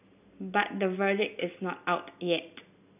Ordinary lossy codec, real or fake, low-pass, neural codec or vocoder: none; real; 3.6 kHz; none